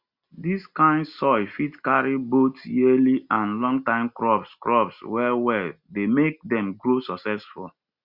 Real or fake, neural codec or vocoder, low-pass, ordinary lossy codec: real; none; 5.4 kHz; Opus, 64 kbps